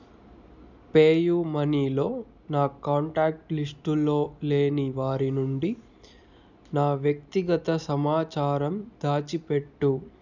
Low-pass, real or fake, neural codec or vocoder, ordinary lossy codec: 7.2 kHz; real; none; none